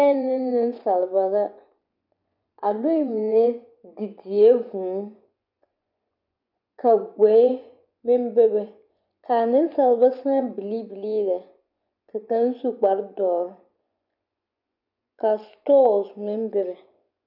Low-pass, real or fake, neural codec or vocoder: 5.4 kHz; fake; vocoder, 24 kHz, 100 mel bands, Vocos